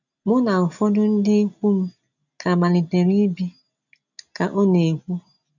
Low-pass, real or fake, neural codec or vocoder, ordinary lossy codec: 7.2 kHz; real; none; none